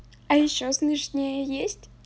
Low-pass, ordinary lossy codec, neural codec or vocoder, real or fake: none; none; none; real